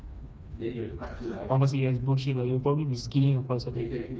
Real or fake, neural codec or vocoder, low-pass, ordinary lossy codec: fake; codec, 16 kHz, 2 kbps, FreqCodec, smaller model; none; none